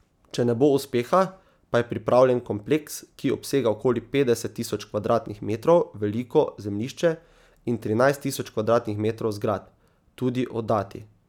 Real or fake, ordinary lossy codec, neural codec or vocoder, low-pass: real; none; none; 19.8 kHz